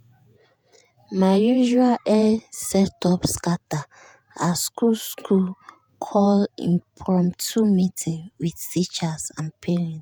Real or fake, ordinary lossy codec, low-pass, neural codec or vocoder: fake; none; none; vocoder, 48 kHz, 128 mel bands, Vocos